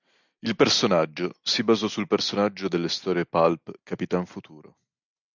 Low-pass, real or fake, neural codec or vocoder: 7.2 kHz; real; none